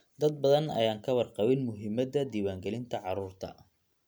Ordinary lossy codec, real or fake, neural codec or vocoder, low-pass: none; real; none; none